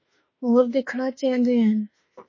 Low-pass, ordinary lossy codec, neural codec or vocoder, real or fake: 7.2 kHz; MP3, 32 kbps; autoencoder, 48 kHz, 32 numbers a frame, DAC-VAE, trained on Japanese speech; fake